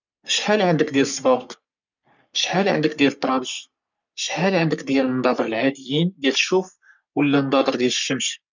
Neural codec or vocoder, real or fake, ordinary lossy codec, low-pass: codec, 44.1 kHz, 3.4 kbps, Pupu-Codec; fake; none; 7.2 kHz